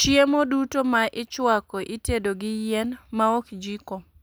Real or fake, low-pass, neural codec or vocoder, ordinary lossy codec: real; none; none; none